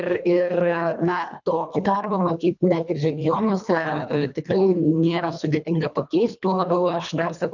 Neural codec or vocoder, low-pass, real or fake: codec, 24 kHz, 1.5 kbps, HILCodec; 7.2 kHz; fake